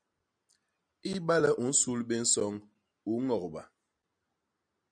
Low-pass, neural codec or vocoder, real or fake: 9.9 kHz; none; real